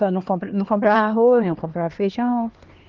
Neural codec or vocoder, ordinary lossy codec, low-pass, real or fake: codec, 16 kHz, 2 kbps, X-Codec, HuBERT features, trained on LibriSpeech; Opus, 16 kbps; 7.2 kHz; fake